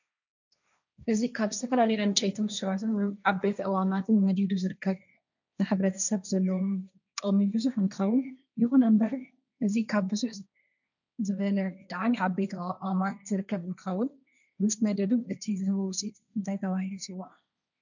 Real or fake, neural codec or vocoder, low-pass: fake; codec, 16 kHz, 1.1 kbps, Voila-Tokenizer; 7.2 kHz